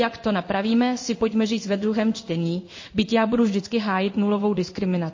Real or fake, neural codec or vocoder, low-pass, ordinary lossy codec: fake; codec, 16 kHz in and 24 kHz out, 1 kbps, XY-Tokenizer; 7.2 kHz; MP3, 32 kbps